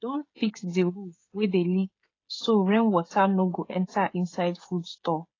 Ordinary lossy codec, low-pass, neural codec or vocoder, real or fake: AAC, 32 kbps; 7.2 kHz; codec, 16 kHz, 16 kbps, FreqCodec, smaller model; fake